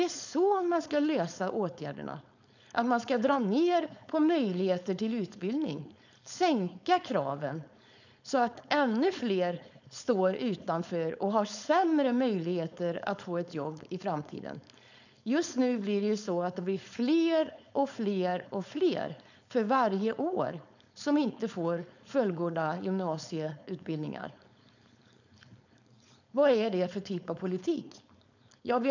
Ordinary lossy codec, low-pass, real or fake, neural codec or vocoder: none; 7.2 kHz; fake; codec, 16 kHz, 4.8 kbps, FACodec